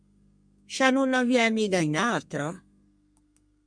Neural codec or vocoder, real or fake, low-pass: codec, 32 kHz, 1.9 kbps, SNAC; fake; 9.9 kHz